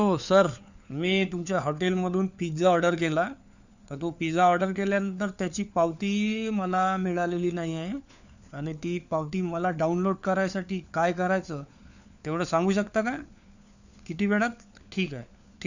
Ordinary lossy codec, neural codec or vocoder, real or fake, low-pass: none; codec, 16 kHz, 4 kbps, FunCodec, trained on LibriTTS, 50 frames a second; fake; 7.2 kHz